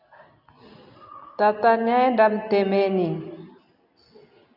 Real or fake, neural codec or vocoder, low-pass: real; none; 5.4 kHz